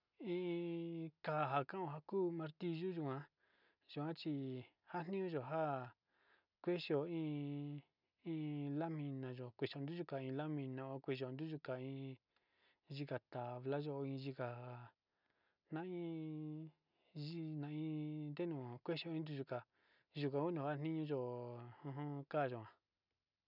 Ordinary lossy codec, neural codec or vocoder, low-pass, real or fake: none; none; 5.4 kHz; real